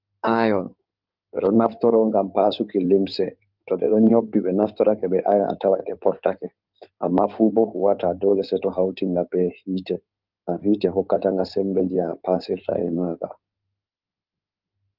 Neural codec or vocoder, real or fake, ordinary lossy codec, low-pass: codec, 16 kHz in and 24 kHz out, 2.2 kbps, FireRedTTS-2 codec; fake; Opus, 32 kbps; 5.4 kHz